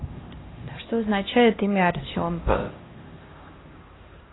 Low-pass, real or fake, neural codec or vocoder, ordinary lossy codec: 7.2 kHz; fake; codec, 16 kHz, 1 kbps, X-Codec, HuBERT features, trained on LibriSpeech; AAC, 16 kbps